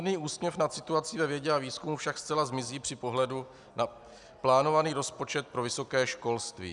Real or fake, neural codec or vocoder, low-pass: real; none; 10.8 kHz